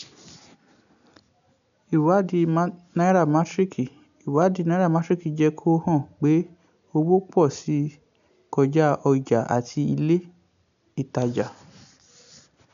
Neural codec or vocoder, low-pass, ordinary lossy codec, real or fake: none; 7.2 kHz; none; real